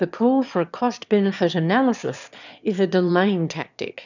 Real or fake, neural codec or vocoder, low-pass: fake; autoencoder, 22.05 kHz, a latent of 192 numbers a frame, VITS, trained on one speaker; 7.2 kHz